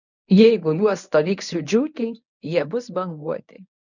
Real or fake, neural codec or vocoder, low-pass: fake; codec, 24 kHz, 0.9 kbps, WavTokenizer, medium speech release version 1; 7.2 kHz